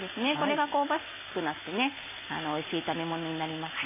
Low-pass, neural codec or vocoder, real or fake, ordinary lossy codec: 3.6 kHz; none; real; MP3, 16 kbps